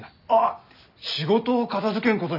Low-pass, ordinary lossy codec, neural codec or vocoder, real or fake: 5.4 kHz; none; none; real